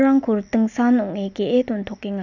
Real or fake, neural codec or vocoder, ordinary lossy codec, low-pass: real; none; none; 7.2 kHz